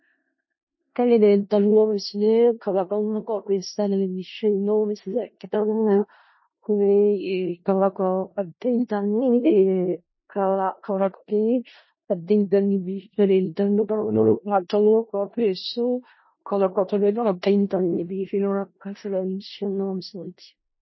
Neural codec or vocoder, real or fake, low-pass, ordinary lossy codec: codec, 16 kHz in and 24 kHz out, 0.4 kbps, LongCat-Audio-Codec, four codebook decoder; fake; 7.2 kHz; MP3, 24 kbps